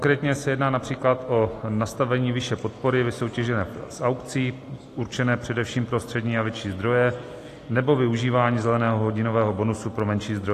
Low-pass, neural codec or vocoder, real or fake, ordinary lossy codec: 14.4 kHz; none; real; AAC, 48 kbps